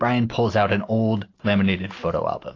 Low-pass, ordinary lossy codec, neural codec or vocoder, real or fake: 7.2 kHz; AAC, 32 kbps; none; real